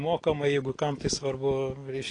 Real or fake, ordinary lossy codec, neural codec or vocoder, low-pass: real; AAC, 32 kbps; none; 9.9 kHz